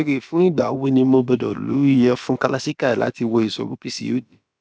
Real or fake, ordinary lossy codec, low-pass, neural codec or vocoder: fake; none; none; codec, 16 kHz, about 1 kbps, DyCAST, with the encoder's durations